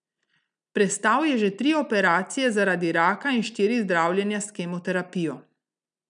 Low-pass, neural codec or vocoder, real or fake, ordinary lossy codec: 9.9 kHz; none; real; none